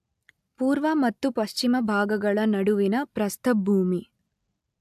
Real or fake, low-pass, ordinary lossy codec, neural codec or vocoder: real; 14.4 kHz; AAC, 96 kbps; none